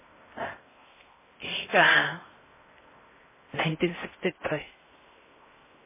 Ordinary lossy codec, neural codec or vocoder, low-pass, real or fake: MP3, 16 kbps; codec, 16 kHz in and 24 kHz out, 0.6 kbps, FocalCodec, streaming, 4096 codes; 3.6 kHz; fake